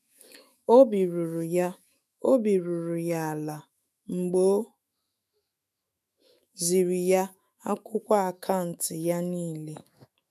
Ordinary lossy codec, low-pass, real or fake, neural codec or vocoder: none; 14.4 kHz; fake; autoencoder, 48 kHz, 128 numbers a frame, DAC-VAE, trained on Japanese speech